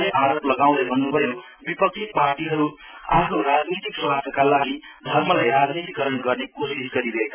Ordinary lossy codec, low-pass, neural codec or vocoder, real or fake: none; 3.6 kHz; none; real